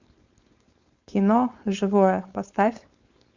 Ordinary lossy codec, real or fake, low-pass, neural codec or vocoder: Opus, 64 kbps; fake; 7.2 kHz; codec, 16 kHz, 4.8 kbps, FACodec